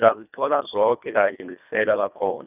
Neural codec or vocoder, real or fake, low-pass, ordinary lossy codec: codec, 24 kHz, 1.5 kbps, HILCodec; fake; 3.6 kHz; none